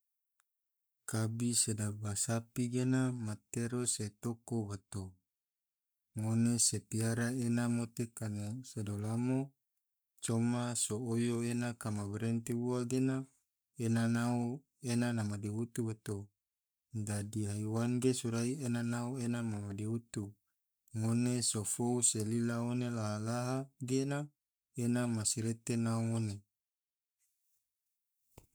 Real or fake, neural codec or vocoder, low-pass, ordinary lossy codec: fake; codec, 44.1 kHz, 7.8 kbps, Pupu-Codec; none; none